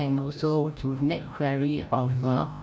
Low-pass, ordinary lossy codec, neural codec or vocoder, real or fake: none; none; codec, 16 kHz, 0.5 kbps, FreqCodec, larger model; fake